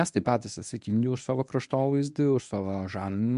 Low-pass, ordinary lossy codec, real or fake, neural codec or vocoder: 10.8 kHz; MP3, 64 kbps; fake; codec, 24 kHz, 0.9 kbps, WavTokenizer, medium speech release version 1